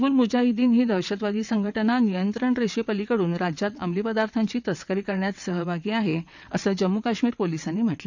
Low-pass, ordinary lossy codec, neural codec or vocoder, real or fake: 7.2 kHz; none; vocoder, 22.05 kHz, 80 mel bands, WaveNeXt; fake